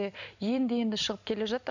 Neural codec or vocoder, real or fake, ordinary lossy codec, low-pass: none; real; none; 7.2 kHz